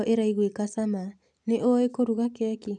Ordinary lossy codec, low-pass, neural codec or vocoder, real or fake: none; 9.9 kHz; none; real